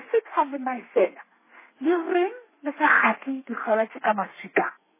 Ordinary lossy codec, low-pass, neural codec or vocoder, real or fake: MP3, 16 kbps; 3.6 kHz; codec, 32 kHz, 1.9 kbps, SNAC; fake